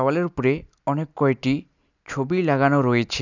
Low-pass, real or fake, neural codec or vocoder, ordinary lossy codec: 7.2 kHz; real; none; none